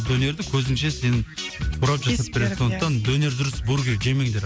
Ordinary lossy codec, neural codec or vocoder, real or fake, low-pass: none; none; real; none